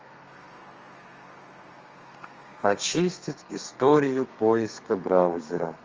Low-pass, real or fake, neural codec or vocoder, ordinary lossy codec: 7.2 kHz; fake; codec, 32 kHz, 1.9 kbps, SNAC; Opus, 24 kbps